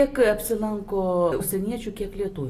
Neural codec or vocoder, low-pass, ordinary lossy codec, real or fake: none; 14.4 kHz; AAC, 48 kbps; real